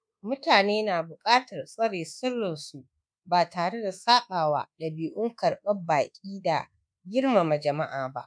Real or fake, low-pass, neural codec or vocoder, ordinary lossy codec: fake; 9.9 kHz; codec, 24 kHz, 1.2 kbps, DualCodec; none